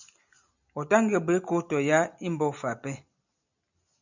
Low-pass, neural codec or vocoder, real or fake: 7.2 kHz; none; real